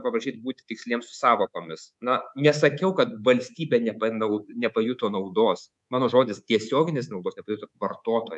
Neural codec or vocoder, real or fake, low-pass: codec, 24 kHz, 3.1 kbps, DualCodec; fake; 10.8 kHz